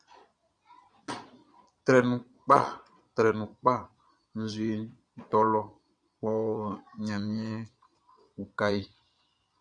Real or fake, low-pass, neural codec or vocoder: fake; 9.9 kHz; vocoder, 22.05 kHz, 80 mel bands, Vocos